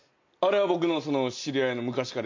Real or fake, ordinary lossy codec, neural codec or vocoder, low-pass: real; MP3, 64 kbps; none; 7.2 kHz